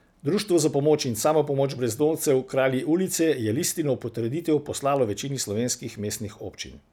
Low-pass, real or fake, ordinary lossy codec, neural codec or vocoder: none; real; none; none